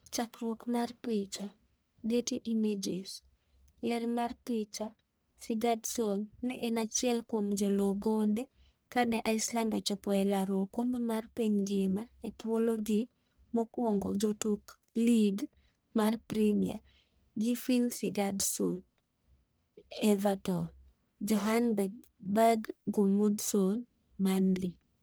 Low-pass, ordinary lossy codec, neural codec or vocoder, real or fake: none; none; codec, 44.1 kHz, 1.7 kbps, Pupu-Codec; fake